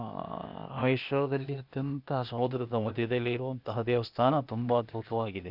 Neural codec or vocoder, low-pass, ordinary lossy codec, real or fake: codec, 16 kHz, 0.8 kbps, ZipCodec; 5.4 kHz; none; fake